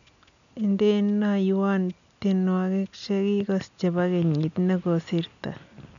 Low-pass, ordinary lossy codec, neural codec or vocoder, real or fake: 7.2 kHz; none; none; real